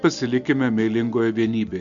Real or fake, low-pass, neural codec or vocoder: real; 7.2 kHz; none